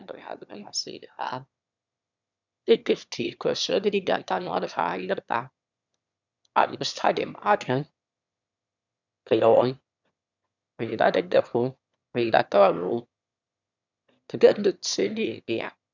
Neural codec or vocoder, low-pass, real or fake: autoencoder, 22.05 kHz, a latent of 192 numbers a frame, VITS, trained on one speaker; 7.2 kHz; fake